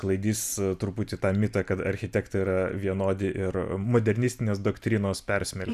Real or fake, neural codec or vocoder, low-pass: real; none; 14.4 kHz